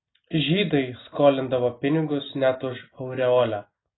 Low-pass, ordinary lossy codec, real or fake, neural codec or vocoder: 7.2 kHz; AAC, 16 kbps; real; none